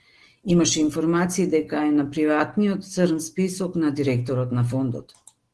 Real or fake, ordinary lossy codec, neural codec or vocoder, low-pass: real; Opus, 16 kbps; none; 10.8 kHz